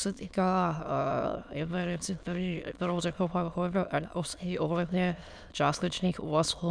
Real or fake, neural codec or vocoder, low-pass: fake; autoencoder, 22.05 kHz, a latent of 192 numbers a frame, VITS, trained on many speakers; 9.9 kHz